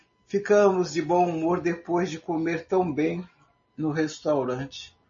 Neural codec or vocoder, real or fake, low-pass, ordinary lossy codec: none; real; 7.2 kHz; MP3, 32 kbps